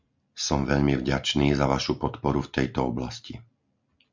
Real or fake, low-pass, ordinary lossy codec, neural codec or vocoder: real; 7.2 kHz; MP3, 64 kbps; none